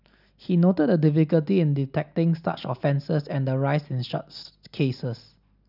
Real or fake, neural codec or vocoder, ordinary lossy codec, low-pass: real; none; none; 5.4 kHz